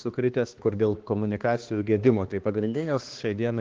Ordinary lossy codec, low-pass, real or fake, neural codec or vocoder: Opus, 16 kbps; 7.2 kHz; fake; codec, 16 kHz, 2 kbps, X-Codec, HuBERT features, trained on balanced general audio